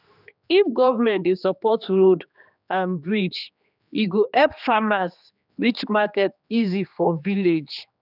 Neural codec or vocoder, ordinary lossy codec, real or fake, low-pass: codec, 16 kHz, 2 kbps, X-Codec, HuBERT features, trained on general audio; none; fake; 5.4 kHz